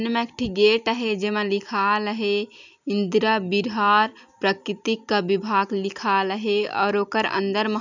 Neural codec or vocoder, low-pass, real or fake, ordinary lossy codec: none; 7.2 kHz; real; none